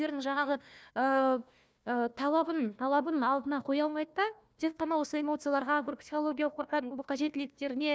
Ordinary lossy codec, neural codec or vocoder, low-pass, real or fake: none; codec, 16 kHz, 1 kbps, FunCodec, trained on Chinese and English, 50 frames a second; none; fake